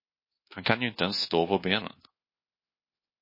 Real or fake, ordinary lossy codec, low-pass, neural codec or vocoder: fake; MP3, 24 kbps; 5.4 kHz; codec, 24 kHz, 1.2 kbps, DualCodec